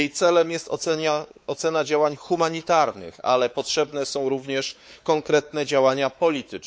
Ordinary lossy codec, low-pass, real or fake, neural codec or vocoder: none; none; fake; codec, 16 kHz, 4 kbps, X-Codec, WavLM features, trained on Multilingual LibriSpeech